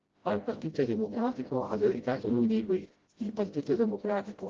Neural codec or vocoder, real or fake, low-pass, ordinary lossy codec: codec, 16 kHz, 0.5 kbps, FreqCodec, smaller model; fake; 7.2 kHz; Opus, 16 kbps